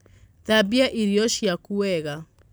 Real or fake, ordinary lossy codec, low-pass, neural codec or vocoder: real; none; none; none